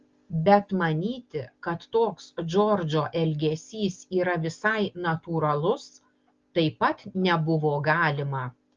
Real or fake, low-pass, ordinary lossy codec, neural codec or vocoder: real; 7.2 kHz; Opus, 32 kbps; none